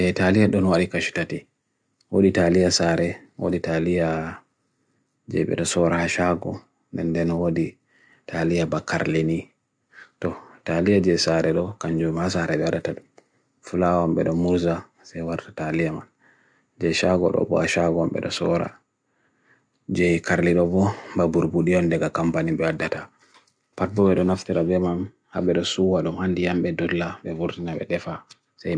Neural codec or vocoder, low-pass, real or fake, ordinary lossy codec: none; 9.9 kHz; real; none